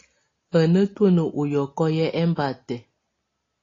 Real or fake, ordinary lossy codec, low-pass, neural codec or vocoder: real; AAC, 32 kbps; 7.2 kHz; none